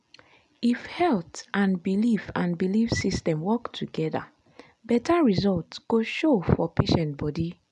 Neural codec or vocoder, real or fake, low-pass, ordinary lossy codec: none; real; 10.8 kHz; none